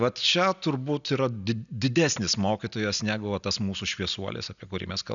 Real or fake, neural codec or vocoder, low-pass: real; none; 7.2 kHz